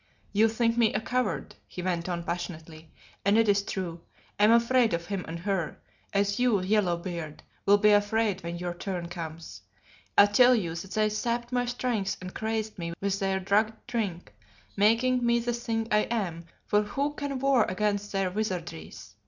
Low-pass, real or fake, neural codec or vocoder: 7.2 kHz; real; none